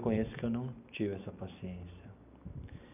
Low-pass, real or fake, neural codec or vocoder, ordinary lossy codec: 3.6 kHz; real; none; none